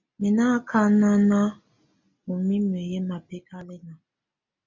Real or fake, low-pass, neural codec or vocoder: real; 7.2 kHz; none